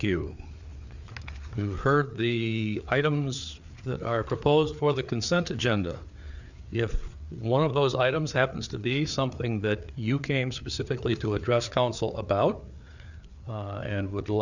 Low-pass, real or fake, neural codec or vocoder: 7.2 kHz; fake; codec, 16 kHz, 4 kbps, FreqCodec, larger model